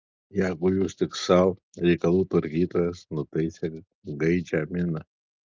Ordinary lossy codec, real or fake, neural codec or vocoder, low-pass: Opus, 24 kbps; real; none; 7.2 kHz